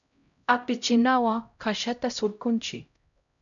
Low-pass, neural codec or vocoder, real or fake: 7.2 kHz; codec, 16 kHz, 0.5 kbps, X-Codec, HuBERT features, trained on LibriSpeech; fake